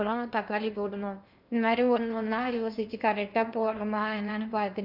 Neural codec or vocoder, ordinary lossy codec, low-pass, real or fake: codec, 16 kHz in and 24 kHz out, 0.8 kbps, FocalCodec, streaming, 65536 codes; none; 5.4 kHz; fake